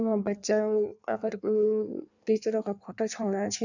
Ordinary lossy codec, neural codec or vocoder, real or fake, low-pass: none; codec, 16 kHz in and 24 kHz out, 1.1 kbps, FireRedTTS-2 codec; fake; 7.2 kHz